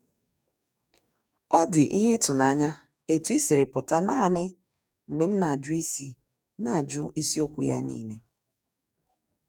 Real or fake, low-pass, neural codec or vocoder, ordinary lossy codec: fake; none; codec, 44.1 kHz, 2.6 kbps, DAC; none